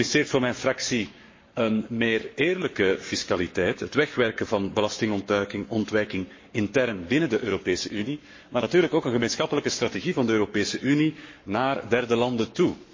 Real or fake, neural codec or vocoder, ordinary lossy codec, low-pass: fake; codec, 44.1 kHz, 7.8 kbps, Pupu-Codec; MP3, 32 kbps; 7.2 kHz